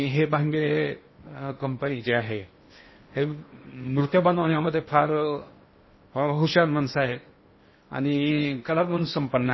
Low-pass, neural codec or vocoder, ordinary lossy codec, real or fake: 7.2 kHz; codec, 16 kHz in and 24 kHz out, 0.8 kbps, FocalCodec, streaming, 65536 codes; MP3, 24 kbps; fake